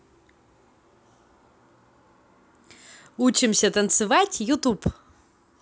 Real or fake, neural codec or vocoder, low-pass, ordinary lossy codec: real; none; none; none